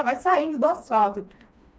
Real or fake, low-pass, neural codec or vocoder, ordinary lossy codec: fake; none; codec, 16 kHz, 2 kbps, FreqCodec, smaller model; none